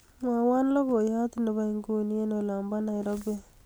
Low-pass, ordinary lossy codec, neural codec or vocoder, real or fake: none; none; none; real